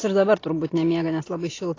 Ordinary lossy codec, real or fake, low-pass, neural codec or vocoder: AAC, 32 kbps; real; 7.2 kHz; none